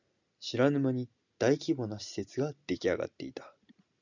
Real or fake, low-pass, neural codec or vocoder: real; 7.2 kHz; none